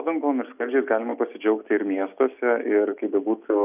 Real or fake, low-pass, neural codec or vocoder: real; 3.6 kHz; none